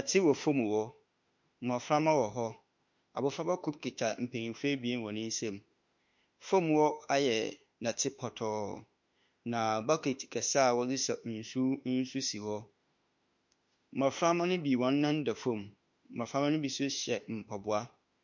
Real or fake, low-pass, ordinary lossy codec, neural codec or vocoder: fake; 7.2 kHz; MP3, 48 kbps; autoencoder, 48 kHz, 32 numbers a frame, DAC-VAE, trained on Japanese speech